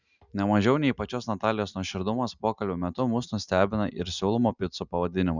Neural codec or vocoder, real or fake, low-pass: none; real; 7.2 kHz